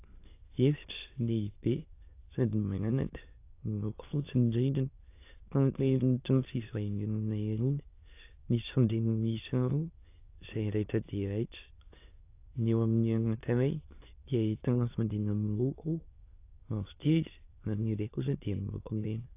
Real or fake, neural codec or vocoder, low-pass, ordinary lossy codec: fake; autoencoder, 22.05 kHz, a latent of 192 numbers a frame, VITS, trained on many speakers; 3.6 kHz; AAC, 24 kbps